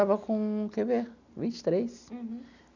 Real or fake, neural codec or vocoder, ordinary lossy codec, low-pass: real; none; none; 7.2 kHz